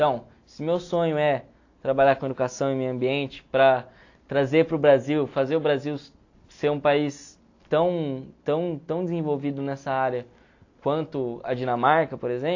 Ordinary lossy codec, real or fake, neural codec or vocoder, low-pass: AAC, 48 kbps; real; none; 7.2 kHz